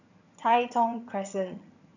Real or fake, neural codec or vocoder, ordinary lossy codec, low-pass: fake; vocoder, 22.05 kHz, 80 mel bands, HiFi-GAN; none; 7.2 kHz